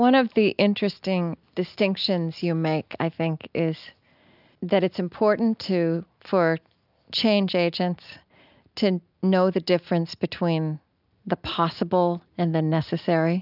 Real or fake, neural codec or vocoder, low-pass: real; none; 5.4 kHz